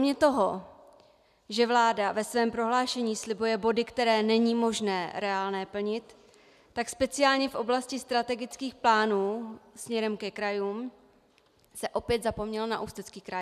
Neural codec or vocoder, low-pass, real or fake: none; 14.4 kHz; real